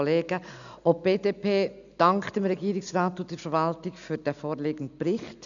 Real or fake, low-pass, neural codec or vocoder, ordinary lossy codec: real; 7.2 kHz; none; none